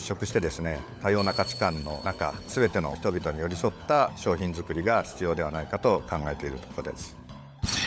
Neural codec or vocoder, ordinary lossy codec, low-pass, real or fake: codec, 16 kHz, 16 kbps, FunCodec, trained on LibriTTS, 50 frames a second; none; none; fake